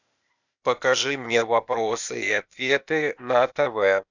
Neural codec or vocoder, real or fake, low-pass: codec, 16 kHz, 0.8 kbps, ZipCodec; fake; 7.2 kHz